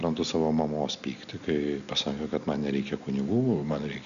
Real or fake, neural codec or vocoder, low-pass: real; none; 7.2 kHz